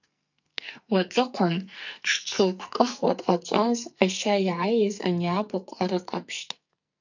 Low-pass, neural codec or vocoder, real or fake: 7.2 kHz; codec, 44.1 kHz, 2.6 kbps, SNAC; fake